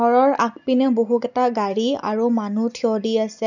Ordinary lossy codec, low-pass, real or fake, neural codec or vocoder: none; 7.2 kHz; real; none